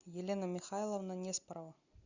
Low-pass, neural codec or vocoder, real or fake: 7.2 kHz; none; real